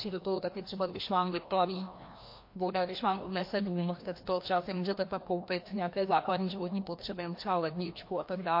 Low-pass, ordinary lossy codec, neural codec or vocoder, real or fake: 5.4 kHz; MP3, 32 kbps; codec, 16 kHz, 1 kbps, FreqCodec, larger model; fake